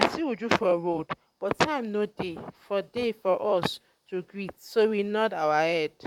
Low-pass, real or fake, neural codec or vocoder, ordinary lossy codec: 19.8 kHz; fake; vocoder, 44.1 kHz, 128 mel bands, Pupu-Vocoder; none